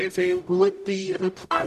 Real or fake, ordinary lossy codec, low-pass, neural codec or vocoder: fake; none; 14.4 kHz; codec, 44.1 kHz, 0.9 kbps, DAC